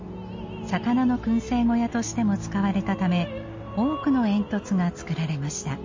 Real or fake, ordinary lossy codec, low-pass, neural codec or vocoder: real; MP3, 32 kbps; 7.2 kHz; none